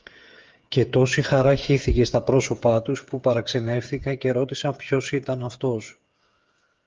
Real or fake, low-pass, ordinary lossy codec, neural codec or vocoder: fake; 7.2 kHz; Opus, 32 kbps; codec, 16 kHz, 8 kbps, FreqCodec, smaller model